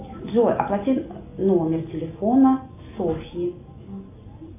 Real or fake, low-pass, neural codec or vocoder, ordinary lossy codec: real; 3.6 kHz; none; AAC, 24 kbps